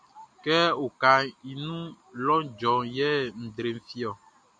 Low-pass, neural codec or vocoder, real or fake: 9.9 kHz; none; real